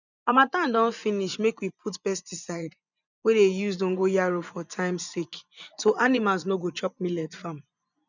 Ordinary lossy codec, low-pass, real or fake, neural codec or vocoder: none; 7.2 kHz; fake; vocoder, 24 kHz, 100 mel bands, Vocos